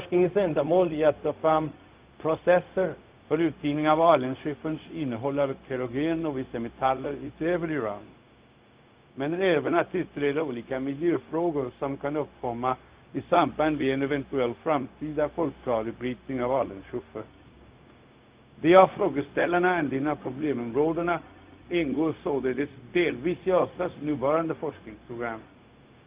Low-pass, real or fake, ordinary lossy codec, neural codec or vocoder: 3.6 kHz; fake; Opus, 32 kbps; codec, 16 kHz, 0.4 kbps, LongCat-Audio-Codec